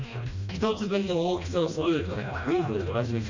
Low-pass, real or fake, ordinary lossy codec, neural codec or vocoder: 7.2 kHz; fake; MP3, 64 kbps; codec, 16 kHz, 1 kbps, FreqCodec, smaller model